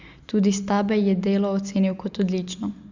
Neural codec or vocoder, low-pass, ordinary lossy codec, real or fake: none; 7.2 kHz; Opus, 64 kbps; real